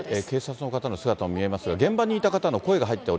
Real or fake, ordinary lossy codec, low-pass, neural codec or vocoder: real; none; none; none